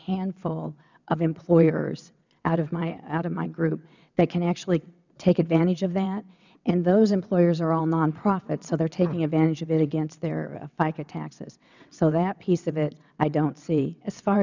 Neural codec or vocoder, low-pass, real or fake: vocoder, 22.05 kHz, 80 mel bands, WaveNeXt; 7.2 kHz; fake